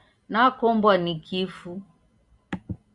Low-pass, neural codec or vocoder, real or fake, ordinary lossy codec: 10.8 kHz; none; real; Opus, 64 kbps